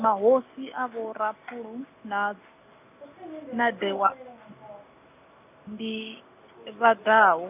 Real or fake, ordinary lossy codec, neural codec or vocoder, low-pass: real; none; none; 3.6 kHz